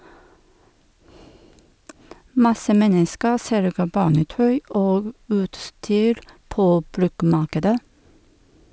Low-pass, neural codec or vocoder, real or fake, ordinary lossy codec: none; none; real; none